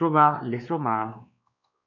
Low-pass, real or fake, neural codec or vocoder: 7.2 kHz; fake; codec, 16 kHz, 2 kbps, X-Codec, WavLM features, trained on Multilingual LibriSpeech